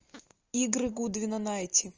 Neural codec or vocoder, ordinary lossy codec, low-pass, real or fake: none; Opus, 24 kbps; 7.2 kHz; real